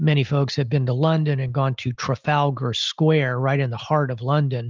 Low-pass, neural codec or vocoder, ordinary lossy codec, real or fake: 7.2 kHz; none; Opus, 32 kbps; real